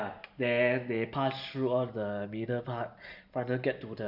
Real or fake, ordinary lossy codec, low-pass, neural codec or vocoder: real; none; 5.4 kHz; none